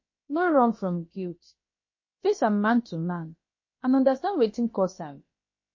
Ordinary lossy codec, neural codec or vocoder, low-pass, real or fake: MP3, 32 kbps; codec, 16 kHz, about 1 kbps, DyCAST, with the encoder's durations; 7.2 kHz; fake